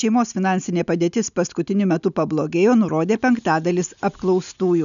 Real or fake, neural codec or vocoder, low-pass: real; none; 7.2 kHz